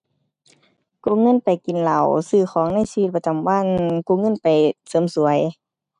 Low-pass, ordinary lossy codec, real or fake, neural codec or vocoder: 10.8 kHz; none; real; none